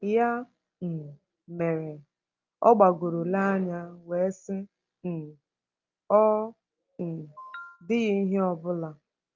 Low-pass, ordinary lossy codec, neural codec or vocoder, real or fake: 7.2 kHz; Opus, 32 kbps; none; real